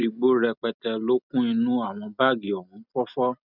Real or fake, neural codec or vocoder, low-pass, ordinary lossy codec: real; none; 5.4 kHz; none